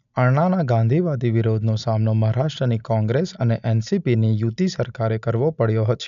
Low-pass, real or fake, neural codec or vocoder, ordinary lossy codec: 7.2 kHz; real; none; none